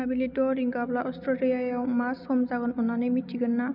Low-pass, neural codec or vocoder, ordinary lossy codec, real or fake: 5.4 kHz; none; none; real